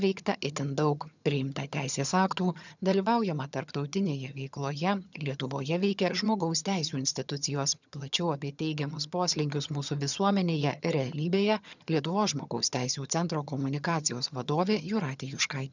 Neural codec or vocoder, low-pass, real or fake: vocoder, 22.05 kHz, 80 mel bands, HiFi-GAN; 7.2 kHz; fake